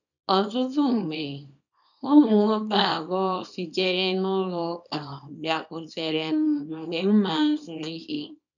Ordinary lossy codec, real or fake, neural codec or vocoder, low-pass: none; fake; codec, 24 kHz, 0.9 kbps, WavTokenizer, small release; 7.2 kHz